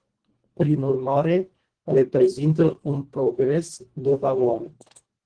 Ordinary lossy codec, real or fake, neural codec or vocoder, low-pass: Opus, 16 kbps; fake; codec, 24 kHz, 1.5 kbps, HILCodec; 9.9 kHz